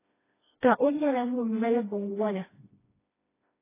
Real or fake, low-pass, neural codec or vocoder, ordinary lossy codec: fake; 3.6 kHz; codec, 16 kHz, 1 kbps, FreqCodec, smaller model; AAC, 16 kbps